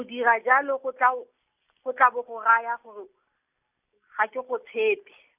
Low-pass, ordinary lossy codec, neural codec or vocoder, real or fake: 3.6 kHz; none; none; real